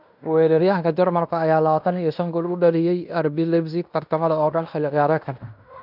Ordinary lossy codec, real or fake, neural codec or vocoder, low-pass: none; fake; codec, 16 kHz in and 24 kHz out, 0.9 kbps, LongCat-Audio-Codec, fine tuned four codebook decoder; 5.4 kHz